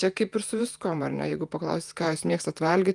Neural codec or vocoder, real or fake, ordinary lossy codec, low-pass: vocoder, 48 kHz, 128 mel bands, Vocos; fake; Opus, 32 kbps; 10.8 kHz